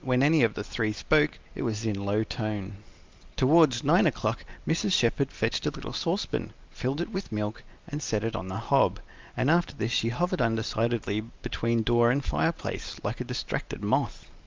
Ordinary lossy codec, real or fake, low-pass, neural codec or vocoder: Opus, 24 kbps; real; 7.2 kHz; none